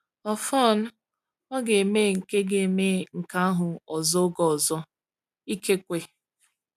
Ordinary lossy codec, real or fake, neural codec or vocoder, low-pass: none; real; none; 14.4 kHz